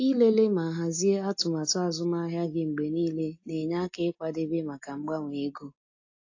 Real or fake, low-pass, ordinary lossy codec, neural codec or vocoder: real; 7.2 kHz; AAC, 48 kbps; none